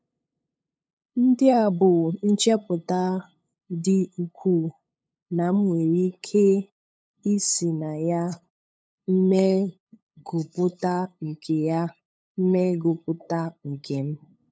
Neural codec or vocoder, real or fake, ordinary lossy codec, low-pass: codec, 16 kHz, 8 kbps, FunCodec, trained on LibriTTS, 25 frames a second; fake; none; none